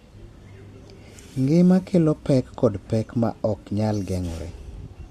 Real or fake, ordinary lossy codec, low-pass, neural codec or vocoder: real; MP3, 64 kbps; 14.4 kHz; none